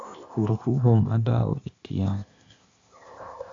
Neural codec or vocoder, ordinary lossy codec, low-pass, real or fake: codec, 16 kHz, 0.8 kbps, ZipCodec; AAC, 48 kbps; 7.2 kHz; fake